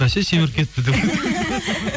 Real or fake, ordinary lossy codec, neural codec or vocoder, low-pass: real; none; none; none